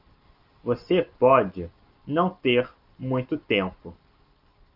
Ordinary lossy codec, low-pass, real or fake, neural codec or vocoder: Opus, 32 kbps; 5.4 kHz; real; none